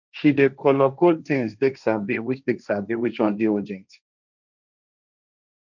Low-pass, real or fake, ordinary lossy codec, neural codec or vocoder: 7.2 kHz; fake; none; codec, 16 kHz, 1.1 kbps, Voila-Tokenizer